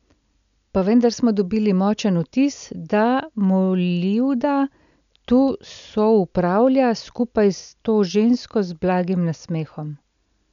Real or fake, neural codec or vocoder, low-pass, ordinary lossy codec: real; none; 7.2 kHz; none